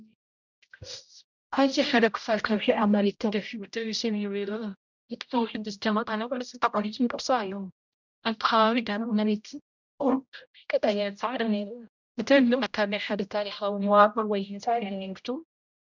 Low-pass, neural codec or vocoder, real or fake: 7.2 kHz; codec, 16 kHz, 0.5 kbps, X-Codec, HuBERT features, trained on general audio; fake